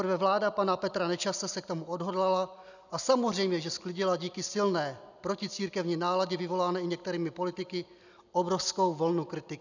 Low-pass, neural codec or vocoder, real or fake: 7.2 kHz; none; real